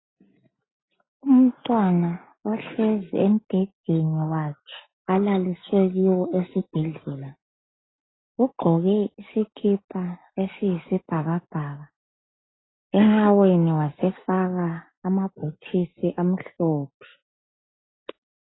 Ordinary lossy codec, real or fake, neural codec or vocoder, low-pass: AAC, 16 kbps; real; none; 7.2 kHz